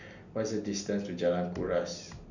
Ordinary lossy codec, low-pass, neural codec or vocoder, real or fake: none; 7.2 kHz; none; real